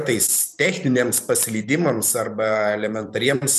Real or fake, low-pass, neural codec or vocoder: fake; 14.4 kHz; vocoder, 44.1 kHz, 128 mel bands every 512 samples, BigVGAN v2